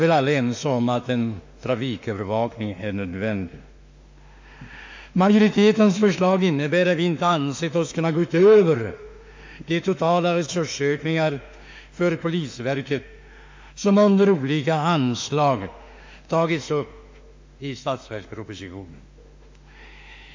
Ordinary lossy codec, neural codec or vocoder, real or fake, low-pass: MP3, 48 kbps; autoencoder, 48 kHz, 32 numbers a frame, DAC-VAE, trained on Japanese speech; fake; 7.2 kHz